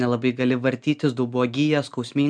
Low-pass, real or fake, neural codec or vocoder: 9.9 kHz; real; none